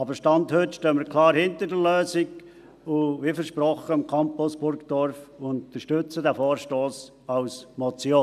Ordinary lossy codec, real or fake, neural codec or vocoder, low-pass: none; real; none; 14.4 kHz